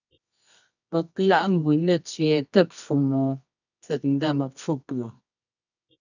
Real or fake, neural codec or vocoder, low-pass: fake; codec, 24 kHz, 0.9 kbps, WavTokenizer, medium music audio release; 7.2 kHz